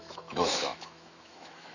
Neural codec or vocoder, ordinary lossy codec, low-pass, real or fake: autoencoder, 48 kHz, 128 numbers a frame, DAC-VAE, trained on Japanese speech; none; 7.2 kHz; fake